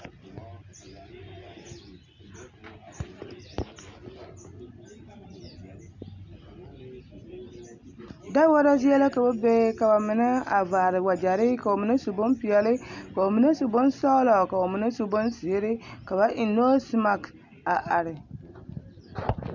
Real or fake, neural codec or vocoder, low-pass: real; none; 7.2 kHz